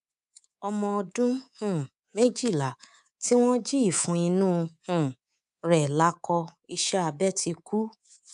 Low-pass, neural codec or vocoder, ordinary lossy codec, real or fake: 10.8 kHz; codec, 24 kHz, 3.1 kbps, DualCodec; none; fake